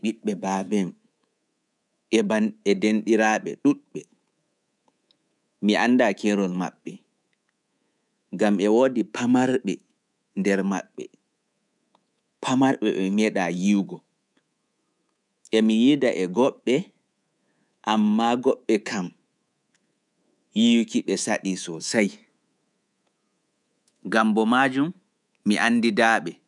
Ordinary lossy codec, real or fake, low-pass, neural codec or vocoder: none; fake; 10.8 kHz; codec, 24 kHz, 3.1 kbps, DualCodec